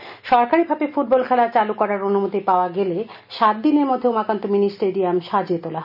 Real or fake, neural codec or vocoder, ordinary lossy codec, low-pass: real; none; none; 5.4 kHz